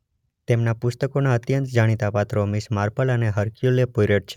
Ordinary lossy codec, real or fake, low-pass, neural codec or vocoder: none; real; 19.8 kHz; none